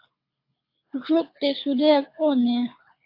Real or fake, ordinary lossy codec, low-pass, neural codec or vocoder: fake; AAC, 32 kbps; 5.4 kHz; codec, 24 kHz, 6 kbps, HILCodec